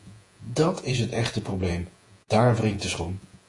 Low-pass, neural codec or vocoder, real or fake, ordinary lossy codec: 10.8 kHz; vocoder, 48 kHz, 128 mel bands, Vocos; fake; AAC, 48 kbps